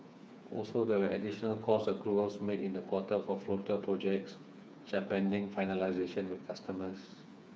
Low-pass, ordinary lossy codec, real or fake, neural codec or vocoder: none; none; fake; codec, 16 kHz, 4 kbps, FreqCodec, smaller model